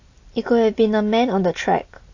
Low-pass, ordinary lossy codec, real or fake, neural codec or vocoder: 7.2 kHz; AAC, 48 kbps; real; none